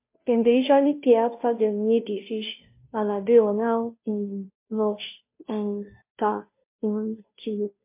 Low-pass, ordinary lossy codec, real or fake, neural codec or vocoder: 3.6 kHz; MP3, 24 kbps; fake; codec, 16 kHz, 0.5 kbps, FunCodec, trained on Chinese and English, 25 frames a second